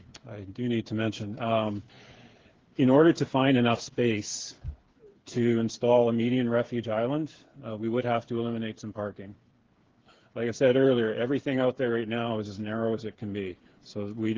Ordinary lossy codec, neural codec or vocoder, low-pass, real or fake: Opus, 16 kbps; codec, 16 kHz, 8 kbps, FreqCodec, smaller model; 7.2 kHz; fake